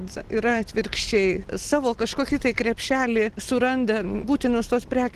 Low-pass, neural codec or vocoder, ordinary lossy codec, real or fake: 14.4 kHz; none; Opus, 16 kbps; real